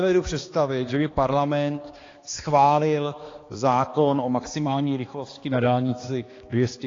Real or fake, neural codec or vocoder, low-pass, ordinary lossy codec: fake; codec, 16 kHz, 2 kbps, X-Codec, HuBERT features, trained on balanced general audio; 7.2 kHz; AAC, 32 kbps